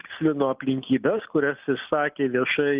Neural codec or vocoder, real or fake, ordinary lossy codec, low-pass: none; real; Opus, 32 kbps; 3.6 kHz